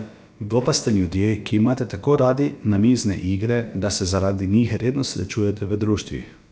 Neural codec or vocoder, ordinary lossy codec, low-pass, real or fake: codec, 16 kHz, about 1 kbps, DyCAST, with the encoder's durations; none; none; fake